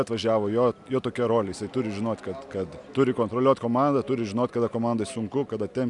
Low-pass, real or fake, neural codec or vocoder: 10.8 kHz; real; none